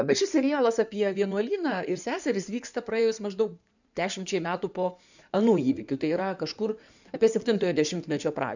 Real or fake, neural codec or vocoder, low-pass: fake; codec, 16 kHz in and 24 kHz out, 2.2 kbps, FireRedTTS-2 codec; 7.2 kHz